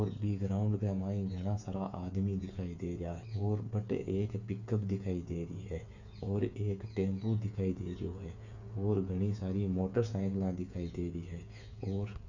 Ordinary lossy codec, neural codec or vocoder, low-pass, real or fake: none; codec, 16 kHz, 8 kbps, FreqCodec, smaller model; 7.2 kHz; fake